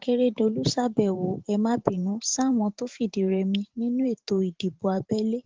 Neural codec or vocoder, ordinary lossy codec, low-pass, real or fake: vocoder, 44.1 kHz, 128 mel bands every 512 samples, BigVGAN v2; Opus, 16 kbps; 7.2 kHz; fake